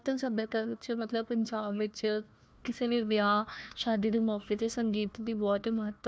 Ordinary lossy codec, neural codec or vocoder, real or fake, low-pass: none; codec, 16 kHz, 1 kbps, FunCodec, trained on Chinese and English, 50 frames a second; fake; none